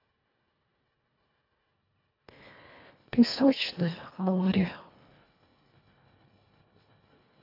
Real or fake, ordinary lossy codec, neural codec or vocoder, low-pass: fake; AAC, 32 kbps; codec, 24 kHz, 1.5 kbps, HILCodec; 5.4 kHz